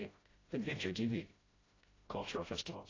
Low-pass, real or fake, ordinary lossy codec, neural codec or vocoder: 7.2 kHz; fake; AAC, 32 kbps; codec, 16 kHz, 0.5 kbps, FreqCodec, smaller model